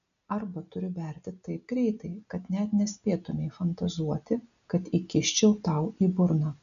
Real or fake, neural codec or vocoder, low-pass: real; none; 7.2 kHz